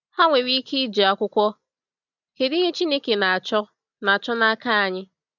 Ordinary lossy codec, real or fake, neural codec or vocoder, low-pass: none; real; none; 7.2 kHz